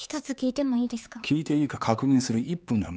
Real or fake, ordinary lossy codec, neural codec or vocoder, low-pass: fake; none; codec, 16 kHz, 4 kbps, X-Codec, HuBERT features, trained on LibriSpeech; none